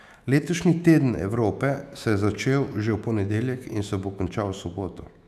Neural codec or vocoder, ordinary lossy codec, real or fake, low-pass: autoencoder, 48 kHz, 128 numbers a frame, DAC-VAE, trained on Japanese speech; none; fake; 14.4 kHz